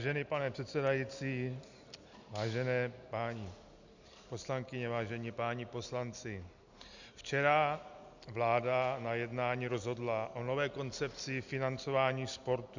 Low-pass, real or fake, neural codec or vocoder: 7.2 kHz; real; none